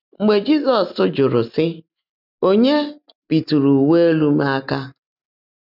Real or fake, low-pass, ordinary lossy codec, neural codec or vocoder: real; 5.4 kHz; none; none